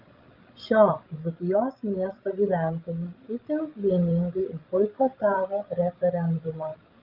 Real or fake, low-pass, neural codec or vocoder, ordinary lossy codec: fake; 5.4 kHz; codec, 16 kHz, 16 kbps, FreqCodec, larger model; Opus, 32 kbps